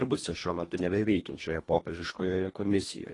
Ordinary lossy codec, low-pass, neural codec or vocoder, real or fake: AAC, 32 kbps; 10.8 kHz; codec, 24 kHz, 1.5 kbps, HILCodec; fake